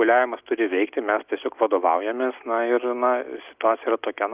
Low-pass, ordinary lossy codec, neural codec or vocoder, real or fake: 3.6 kHz; Opus, 32 kbps; none; real